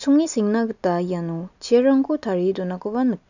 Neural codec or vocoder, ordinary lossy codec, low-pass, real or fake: none; none; 7.2 kHz; real